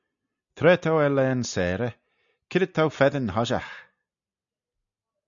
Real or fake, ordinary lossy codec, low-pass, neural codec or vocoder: real; MP3, 96 kbps; 7.2 kHz; none